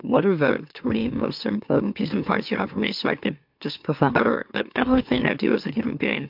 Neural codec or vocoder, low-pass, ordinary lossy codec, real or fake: autoencoder, 44.1 kHz, a latent of 192 numbers a frame, MeloTTS; 5.4 kHz; MP3, 48 kbps; fake